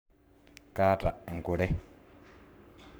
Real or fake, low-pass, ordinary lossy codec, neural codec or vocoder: fake; none; none; codec, 44.1 kHz, 7.8 kbps, Pupu-Codec